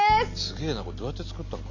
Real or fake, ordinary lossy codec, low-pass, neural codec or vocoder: real; none; 7.2 kHz; none